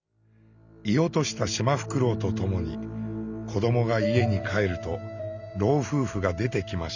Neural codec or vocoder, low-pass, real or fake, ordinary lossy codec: none; 7.2 kHz; real; none